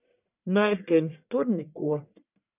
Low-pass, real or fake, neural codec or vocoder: 3.6 kHz; fake; codec, 44.1 kHz, 1.7 kbps, Pupu-Codec